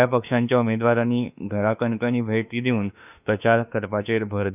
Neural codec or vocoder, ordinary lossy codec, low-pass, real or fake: autoencoder, 48 kHz, 32 numbers a frame, DAC-VAE, trained on Japanese speech; none; 3.6 kHz; fake